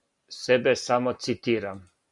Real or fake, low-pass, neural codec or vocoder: real; 10.8 kHz; none